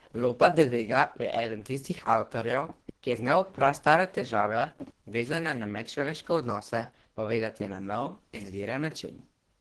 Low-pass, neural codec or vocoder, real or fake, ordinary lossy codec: 10.8 kHz; codec, 24 kHz, 1.5 kbps, HILCodec; fake; Opus, 16 kbps